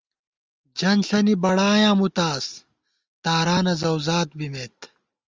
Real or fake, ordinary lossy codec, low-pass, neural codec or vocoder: real; Opus, 32 kbps; 7.2 kHz; none